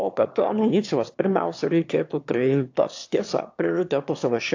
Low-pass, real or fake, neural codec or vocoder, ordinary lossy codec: 7.2 kHz; fake; autoencoder, 22.05 kHz, a latent of 192 numbers a frame, VITS, trained on one speaker; AAC, 48 kbps